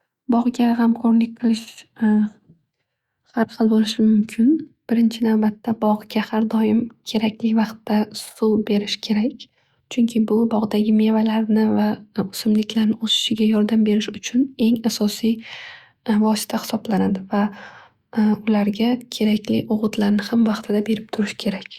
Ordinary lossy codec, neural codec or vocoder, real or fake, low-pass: Opus, 64 kbps; autoencoder, 48 kHz, 128 numbers a frame, DAC-VAE, trained on Japanese speech; fake; 19.8 kHz